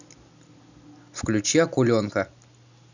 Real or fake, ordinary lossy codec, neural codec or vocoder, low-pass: real; none; none; 7.2 kHz